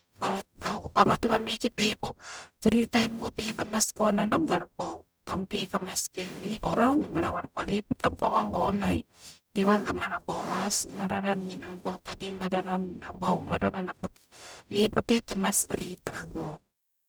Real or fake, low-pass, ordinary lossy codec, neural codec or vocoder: fake; none; none; codec, 44.1 kHz, 0.9 kbps, DAC